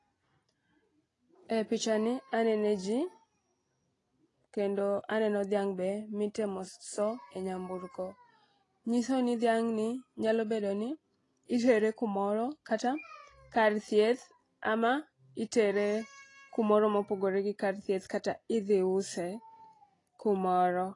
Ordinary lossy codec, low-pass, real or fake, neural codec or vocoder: AAC, 32 kbps; 10.8 kHz; real; none